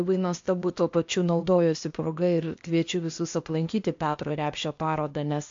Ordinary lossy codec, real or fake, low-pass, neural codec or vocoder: MP3, 48 kbps; fake; 7.2 kHz; codec, 16 kHz, 0.8 kbps, ZipCodec